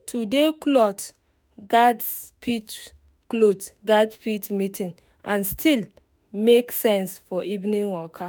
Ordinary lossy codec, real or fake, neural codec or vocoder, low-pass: none; fake; autoencoder, 48 kHz, 32 numbers a frame, DAC-VAE, trained on Japanese speech; none